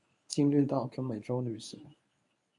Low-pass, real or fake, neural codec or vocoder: 10.8 kHz; fake; codec, 24 kHz, 0.9 kbps, WavTokenizer, medium speech release version 1